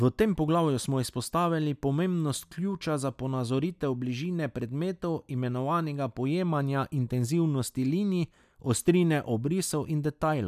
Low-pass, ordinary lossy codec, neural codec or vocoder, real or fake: 14.4 kHz; none; none; real